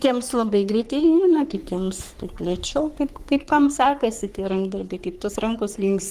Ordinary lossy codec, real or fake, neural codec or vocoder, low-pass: Opus, 24 kbps; fake; codec, 44.1 kHz, 3.4 kbps, Pupu-Codec; 14.4 kHz